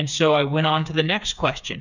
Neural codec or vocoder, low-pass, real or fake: codec, 16 kHz, 4 kbps, FreqCodec, smaller model; 7.2 kHz; fake